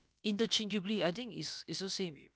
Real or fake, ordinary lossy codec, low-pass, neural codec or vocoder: fake; none; none; codec, 16 kHz, about 1 kbps, DyCAST, with the encoder's durations